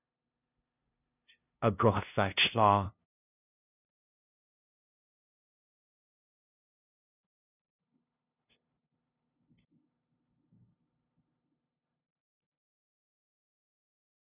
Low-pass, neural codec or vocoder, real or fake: 3.6 kHz; codec, 16 kHz, 0.5 kbps, FunCodec, trained on LibriTTS, 25 frames a second; fake